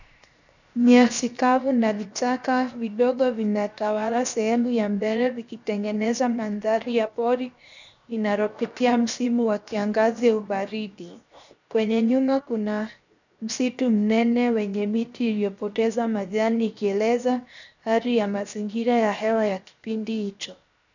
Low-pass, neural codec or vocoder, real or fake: 7.2 kHz; codec, 16 kHz, 0.7 kbps, FocalCodec; fake